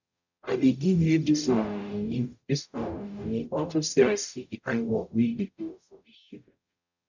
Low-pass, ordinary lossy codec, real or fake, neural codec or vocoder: 7.2 kHz; none; fake; codec, 44.1 kHz, 0.9 kbps, DAC